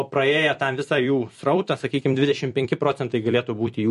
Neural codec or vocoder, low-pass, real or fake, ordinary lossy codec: vocoder, 44.1 kHz, 128 mel bands every 256 samples, BigVGAN v2; 14.4 kHz; fake; MP3, 48 kbps